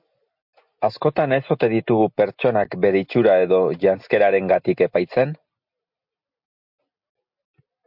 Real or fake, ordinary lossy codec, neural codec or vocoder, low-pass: real; MP3, 48 kbps; none; 5.4 kHz